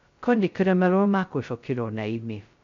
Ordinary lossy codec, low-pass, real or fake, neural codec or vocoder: MP3, 64 kbps; 7.2 kHz; fake; codec, 16 kHz, 0.2 kbps, FocalCodec